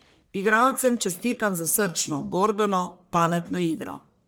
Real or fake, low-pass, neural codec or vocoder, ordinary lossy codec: fake; none; codec, 44.1 kHz, 1.7 kbps, Pupu-Codec; none